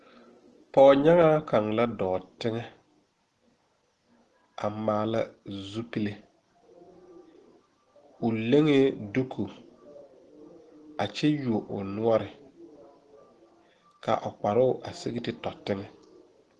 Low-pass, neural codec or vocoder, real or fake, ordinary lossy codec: 10.8 kHz; none; real; Opus, 16 kbps